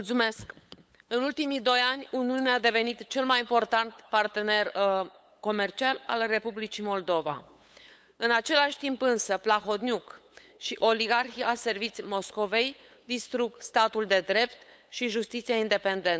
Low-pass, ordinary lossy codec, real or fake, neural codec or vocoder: none; none; fake; codec, 16 kHz, 8 kbps, FunCodec, trained on LibriTTS, 25 frames a second